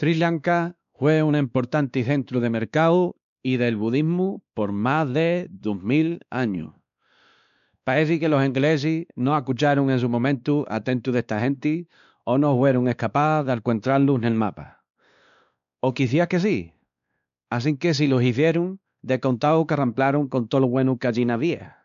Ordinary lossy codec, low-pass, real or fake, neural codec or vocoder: none; 7.2 kHz; fake; codec, 16 kHz, 2 kbps, X-Codec, WavLM features, trained on Multilingual LibriSpeech